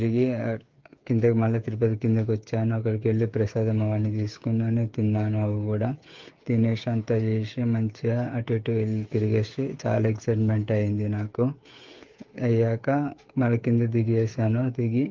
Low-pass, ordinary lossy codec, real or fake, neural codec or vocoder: 7.2 kHz; Opus, 32 kbps; fake; codec, 16 kHz, 8 kbps, FreqCodec, smaller model